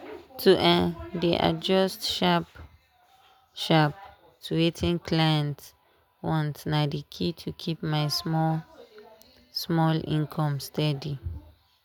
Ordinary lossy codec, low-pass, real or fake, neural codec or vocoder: none; none; real; none